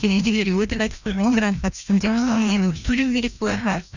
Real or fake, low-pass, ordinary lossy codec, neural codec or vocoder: fake; 7.2 kHz; none; codec, 16 kHz, 1 kbps, FreqCodec, larger model